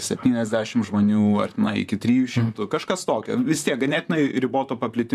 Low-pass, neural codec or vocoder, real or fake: 14.4 kHz; vocoder, 44.1 kHz, 128 mel bands, Pupu-Vocoder; fake